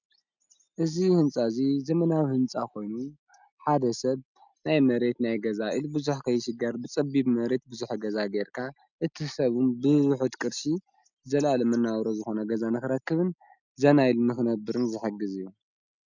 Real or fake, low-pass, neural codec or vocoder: real; 7.2 kHz; none